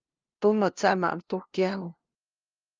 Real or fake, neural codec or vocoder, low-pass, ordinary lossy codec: fake; codec, 16 kHz, 0.5 kbps, FunCodec, trained on LibriTTS, 25 frames a second; 7.2 kHz; Opus, 24 kbps